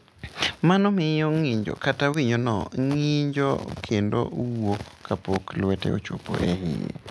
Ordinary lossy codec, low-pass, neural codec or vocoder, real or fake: none; none; none; real